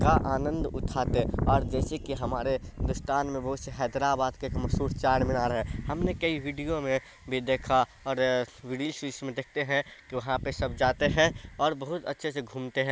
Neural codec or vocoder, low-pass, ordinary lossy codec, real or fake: none; none; none; real